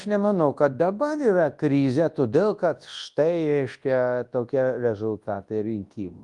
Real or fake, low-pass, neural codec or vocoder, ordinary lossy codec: fake; 10.8 kHz; codec, 24 kHz, 0.9 kbps, WavTokenizer, large speech release; Opus, 32 kbps